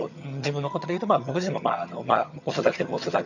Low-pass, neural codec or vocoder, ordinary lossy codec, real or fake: 7.2 kHz; vocoder, 22.05 kHz, 80 mel bands, HiFi-GAN; none; fake